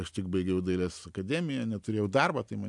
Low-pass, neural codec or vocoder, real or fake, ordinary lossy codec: 10.8 kHz; none; real; MP3, 96 kbps